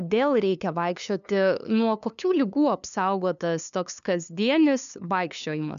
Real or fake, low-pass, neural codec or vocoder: fake; 7.2 kHz; codec, 16 kHz, 2 kbps, FunCodec, trained on LibriTTS, 25 frames a second